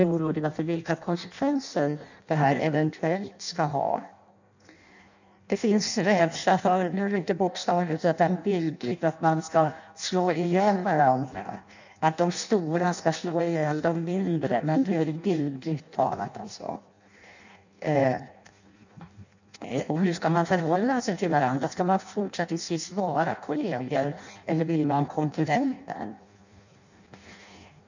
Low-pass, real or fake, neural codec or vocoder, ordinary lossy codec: 7.2 kHz; fake; codec, 16 kHz in and 24 kHz out, 0.6 kbps, FireRedTTS-2 codec; none